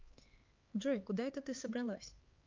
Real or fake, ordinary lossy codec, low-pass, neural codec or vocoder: fake; Opus, 24 kbps; 7.2 kHz; codec, 16 kHz, 4 kbps, X-Codec, HuBERT features, trained on LibriSpeech